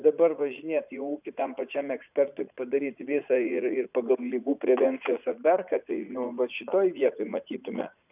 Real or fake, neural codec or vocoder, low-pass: fake; vocoder, 44.1 kHz, 80 mel bands, Vocos; 3.6 kHz